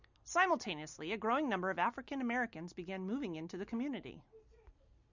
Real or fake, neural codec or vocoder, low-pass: real; none; 7.2 kHz